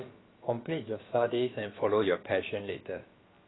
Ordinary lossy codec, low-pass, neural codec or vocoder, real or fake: AAC, 16 kbps; 7.2 kHz; codec, 16 kHz, about 1 kbps, DyCAST, with the encoder's durations; fake